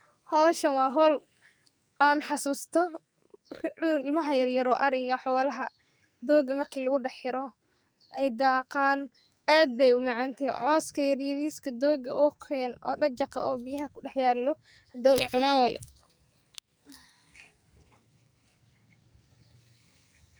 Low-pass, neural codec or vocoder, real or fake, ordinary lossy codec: none; codec, 44.1 kHz, 2.6 kbps, SNAC; fake; none